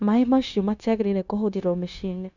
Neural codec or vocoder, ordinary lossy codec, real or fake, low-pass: codec, 16 kHz, 0.9 kbps, LongCat-Audio-Codec; none; fake; 7.2 kHz